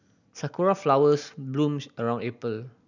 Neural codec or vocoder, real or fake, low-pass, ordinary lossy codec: none; real; 7.2 kHz; none